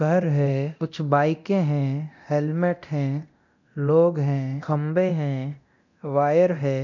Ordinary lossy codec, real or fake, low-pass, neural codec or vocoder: none; fake; 7.2 kHz; codec, 24 kHz, 0.9 kbps, DualCodec